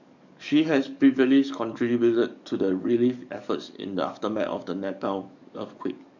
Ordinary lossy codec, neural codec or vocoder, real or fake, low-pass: none; codec, 44.1 kHz, 7.8 kbps, DAC; fake; 7.2 kHz